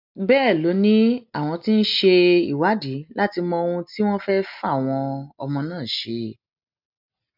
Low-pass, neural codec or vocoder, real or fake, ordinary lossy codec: 5.4 kHz; none; real; none